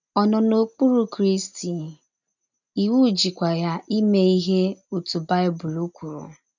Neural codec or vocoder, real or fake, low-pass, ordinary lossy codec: none; real; 7.2 kHz; none